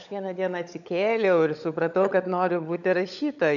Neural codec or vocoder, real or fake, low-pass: codec, 16 kHz, 16 kbps, FunCodec, trained on LibriTTS, 50 frames a second; fake; 7.2 kHz